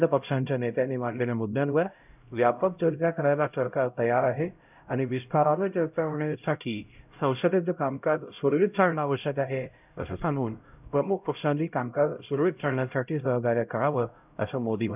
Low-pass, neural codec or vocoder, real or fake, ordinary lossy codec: 3.6 kHz; codec, 16 kHz, 0.5 kbps, X-Codec, HuBERT features, trained on LibriSpeech; fake; none